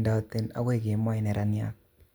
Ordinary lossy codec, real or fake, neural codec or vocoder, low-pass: none; real; none; none